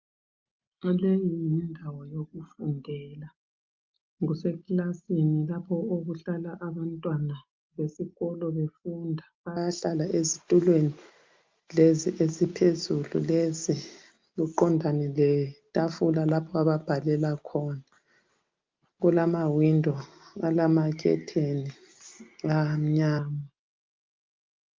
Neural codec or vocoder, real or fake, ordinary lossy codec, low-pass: none; real; Opus, 24 kbps; 7.2 kHz